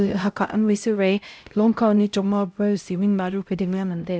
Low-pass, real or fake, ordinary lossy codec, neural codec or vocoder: none; fake; none; codec, 16 kHz, 0.5 kbps, X-Codec, HuBERT features, trained on LibriSpeech